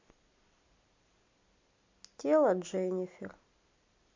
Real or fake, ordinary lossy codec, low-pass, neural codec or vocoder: real; MP3, 64 kbps; 7.2 kHz; none